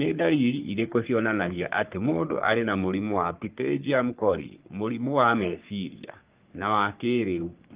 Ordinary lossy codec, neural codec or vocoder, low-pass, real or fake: Opus, 32 kbps; codec, 44.1 kHz, 3.4 kbps, Pupu-Codec; 3.6 kHz; fake